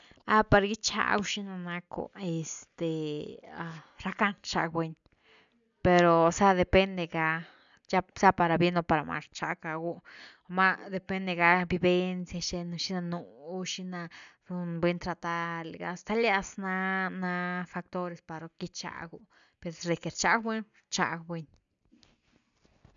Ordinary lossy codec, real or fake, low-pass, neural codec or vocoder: MP3, 96 kbps; real; 7.2 kHz; none